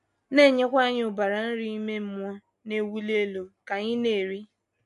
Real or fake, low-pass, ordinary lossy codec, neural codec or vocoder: real; 10.8 kHz; MP3, 64 kbps; none